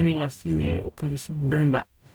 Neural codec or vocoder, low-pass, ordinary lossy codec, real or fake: codec, 44.1 kHz, 0.9 kbps, DAC; none; none; fake